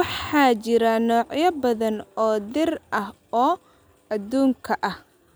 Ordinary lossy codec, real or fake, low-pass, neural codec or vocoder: none; real; none; none